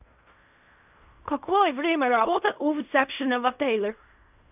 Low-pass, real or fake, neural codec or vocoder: 3.6 kHz; fake; codec, 16 kHz in and 24 kHz out, 0.4 kbps, LongCat-Audio-Codec, fine tuned four codebook decoder